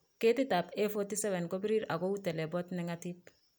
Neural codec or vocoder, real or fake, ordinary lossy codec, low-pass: none; real; none; none